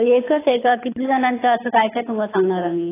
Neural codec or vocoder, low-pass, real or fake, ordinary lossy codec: codec, 24 kHz, 6 kbps, HILCodec; 3.6 kHz; fake; AAC, 16 kbps